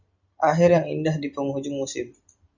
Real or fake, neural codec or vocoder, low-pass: real; none; 7.2 kHz